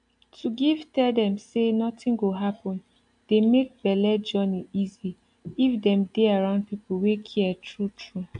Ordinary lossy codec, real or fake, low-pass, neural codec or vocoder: AAC, 64 kbps; real; 9.9 kHz; none